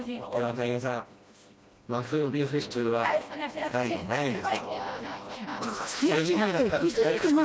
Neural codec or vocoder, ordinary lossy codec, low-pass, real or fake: codec, 16 kHz, 1 kbps, FreqCodec, smaller model; none; none; fake